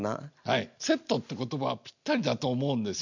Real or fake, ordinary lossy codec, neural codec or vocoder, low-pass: real; none; none; 7.2 kHz